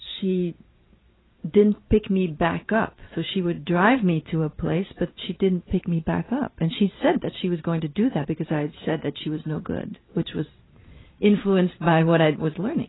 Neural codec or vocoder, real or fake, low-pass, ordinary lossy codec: vocoder, 44.1 kHz, 128 mel bands every 512 samples, BigVGAN v2; fake; 7.2 kHz; AAC, 16 kbps